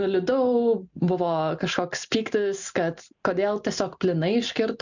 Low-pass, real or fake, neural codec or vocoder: 7.2 kHz; real; none